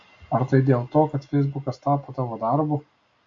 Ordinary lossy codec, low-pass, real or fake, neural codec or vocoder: AAC, 48 kbps; 7.2 kHz; real; none